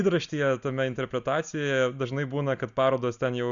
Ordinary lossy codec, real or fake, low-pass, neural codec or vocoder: Opus, 64 kbps; real; 7.2 kHz; none